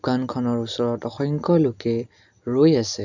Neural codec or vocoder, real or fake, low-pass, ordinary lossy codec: none; real; 7.2 kHz; none